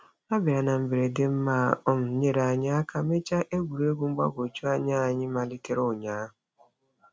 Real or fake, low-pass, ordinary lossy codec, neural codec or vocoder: real; none; none; none